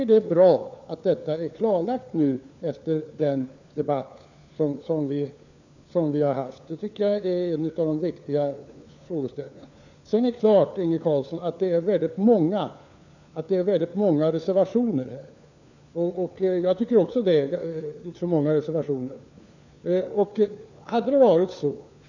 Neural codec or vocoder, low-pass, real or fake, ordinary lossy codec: codec, 16 kHz, 4 kbps, FunCodec, trained on LibriTTS, 50 frames a second; 7.2 kHz; fake; none